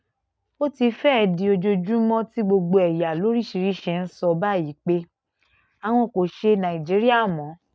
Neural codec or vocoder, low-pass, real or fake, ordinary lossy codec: none; none; real; none